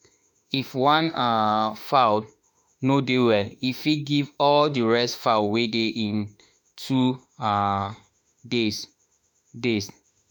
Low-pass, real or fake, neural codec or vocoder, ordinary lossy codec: none; fake; autoencoder, 48 kHz, 32 numbers a frame, DAC-VAE, trained on Japanese speech; none